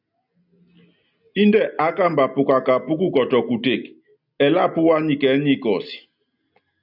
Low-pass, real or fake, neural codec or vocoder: 5.4 kHz; real; none